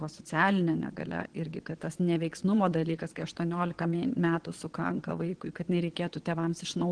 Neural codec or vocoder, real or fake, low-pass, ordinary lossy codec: vocoder, 22.05 kHz, 80 mel bands, WaveNeXt; fake; 9.9 kHz; Opus, 16 kbps